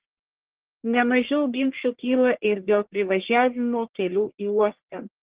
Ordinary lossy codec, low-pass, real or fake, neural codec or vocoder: Opus, 16 kbps; 3.6 kHz; fake; codec, 44.1 kHz, 1.7 kbps, Pupu-Codec